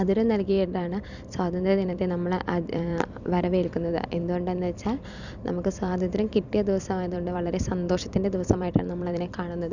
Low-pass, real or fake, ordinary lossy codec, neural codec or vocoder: 7.2 kHz; fake; none; vocoder, 44.1 kHz, 128 mel bands every 256 samples, BigVGAN v2